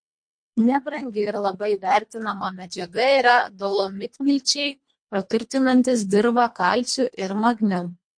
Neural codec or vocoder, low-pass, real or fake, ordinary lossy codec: codec, 24 kHz, 1.5 kbps, HILCodec; 9.9 kHz; fake; MP3, 48 kbps